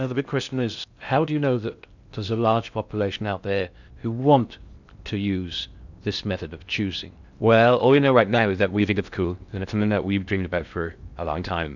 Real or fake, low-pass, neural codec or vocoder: fake; 7.2 kHz; codec, 16 kHz in and 24 kHz out, 0.6 kbps, FocalCodec, streaming, 2048 codes